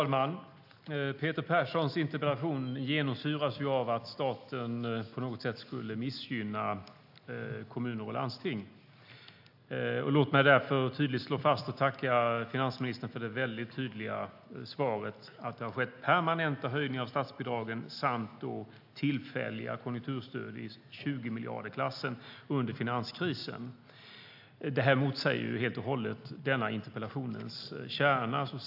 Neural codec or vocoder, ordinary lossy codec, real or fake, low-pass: none; AAC, 48 kbps; real; 5.4 kHz